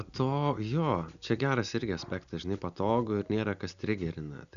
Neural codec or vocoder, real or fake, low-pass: none; real; 7.2 kHz